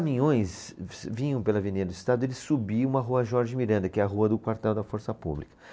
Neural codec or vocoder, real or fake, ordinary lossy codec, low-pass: none; real; none; none